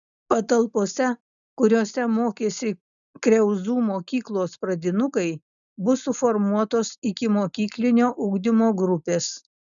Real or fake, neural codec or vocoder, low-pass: real; none; 7.2 kHz